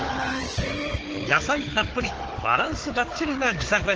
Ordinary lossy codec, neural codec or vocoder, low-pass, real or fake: Opus, 16 kbps; codec, 16 kHz, 4 kbps, FunCodec, trained on Chinese and English, 50 frames a second; 7.2 kHz; fake